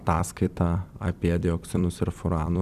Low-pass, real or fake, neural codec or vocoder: 14.4 kHz; fake; vocoder, 44.1 kHz, 128 mel bands every 256 samples, BigVGAN v2